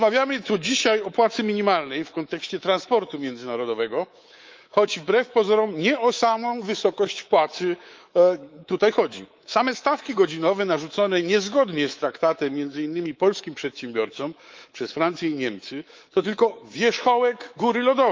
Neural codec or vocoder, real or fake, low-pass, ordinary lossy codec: codec, 24 kHz, 3.1 kbps, DualCodec; fake; 7.2 kHz; Opus, 32 kbps